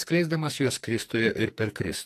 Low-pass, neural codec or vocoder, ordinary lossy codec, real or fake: 14.4 kHz; codec, 44.1 kHz, 2.6 kbps, SNAC; AAC, 64 kbps; fake